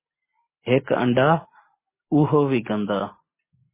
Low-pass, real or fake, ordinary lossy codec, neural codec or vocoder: 3.6 kHz; real; MP3, 16 kbps; none